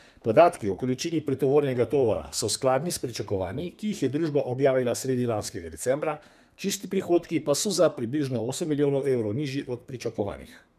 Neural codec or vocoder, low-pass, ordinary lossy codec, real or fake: codec, 32 kHz, 1.9 kbps, SNAC; 14.4 kHz; none; fake